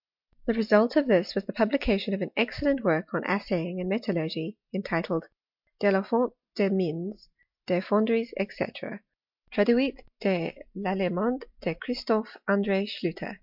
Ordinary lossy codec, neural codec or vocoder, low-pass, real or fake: MP3, 48 kbps; none; 5.4 kHz; real